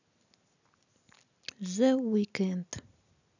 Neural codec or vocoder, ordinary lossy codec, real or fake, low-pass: none; none; real; 7.2 kHz